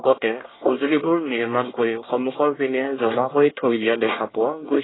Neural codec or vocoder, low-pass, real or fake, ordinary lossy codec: codec, 24 kHz, 1 kbps, SNAC; 7.2 kHz; fake; AAC, 16 kbps